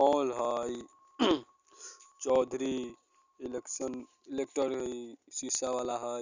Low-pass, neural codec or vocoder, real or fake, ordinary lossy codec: 7.2 kHz; none; real; Opus, 64 kbps